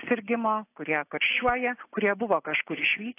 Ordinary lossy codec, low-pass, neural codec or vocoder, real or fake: AAC, 24 kbps; 3.6 kHz; none; real